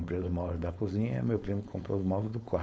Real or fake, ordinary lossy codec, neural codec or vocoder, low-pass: fake; none; codec, 16 kHz, 4.8 kbps, FACodec; none